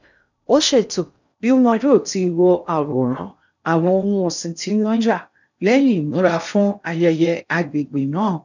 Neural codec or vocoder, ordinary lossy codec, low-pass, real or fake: codec, 16 kHz in and 24 kHz out, 0.6 kbps, FocalCodec, streaming, 4096 codes; none; 7.2 kHz; fake